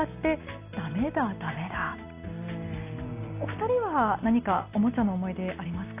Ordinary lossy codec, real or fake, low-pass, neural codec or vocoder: AAC, 24 kbps; real; 3.6 kHz; none